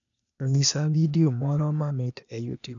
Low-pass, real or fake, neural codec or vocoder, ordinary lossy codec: 7.2 kHz; fake; codec, 16 kHz, 0.8 kbps, ZipCodec; none